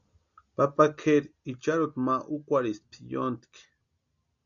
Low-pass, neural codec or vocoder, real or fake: 7.2 kHz; none; real